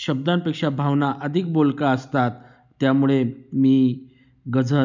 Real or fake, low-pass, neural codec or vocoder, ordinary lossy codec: real; 7.2 kHz; none; none